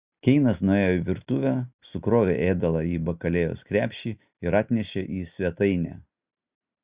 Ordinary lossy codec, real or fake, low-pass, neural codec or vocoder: Opus, 64 kbps; real; 3.6 kHz; none